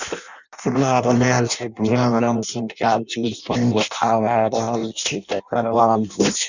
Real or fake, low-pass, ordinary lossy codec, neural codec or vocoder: fake; 7.2 kHz; none; codec, 16 kHz in and 24 kHz out, 0.6 kbps, FireRedTTS-2 codec